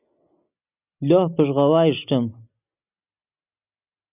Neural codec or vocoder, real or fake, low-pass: none; real; 3.6 kHz